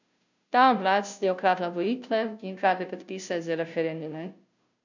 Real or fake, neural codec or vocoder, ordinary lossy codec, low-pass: fake; codec, 16 kHz, 0.5 kbps, FunCodec, trained on Chinese and English, 25 frames a second; none; 7.2 kHz